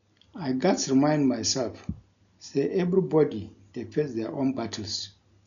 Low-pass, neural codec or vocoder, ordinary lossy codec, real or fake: 7.2 kHz; none; none; real